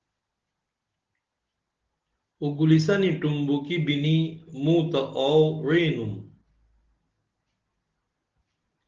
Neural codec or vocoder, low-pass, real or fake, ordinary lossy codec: none; 7.2 kHz; real; Opus, 16 kbps